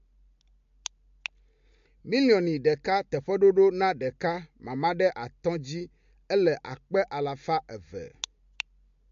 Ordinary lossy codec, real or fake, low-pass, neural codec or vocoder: MP3, 48 kbps; real; 7.2 kHz; none